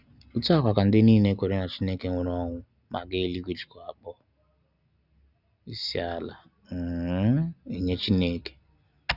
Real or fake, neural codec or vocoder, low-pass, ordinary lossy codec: real; none; 5.4 kHz; none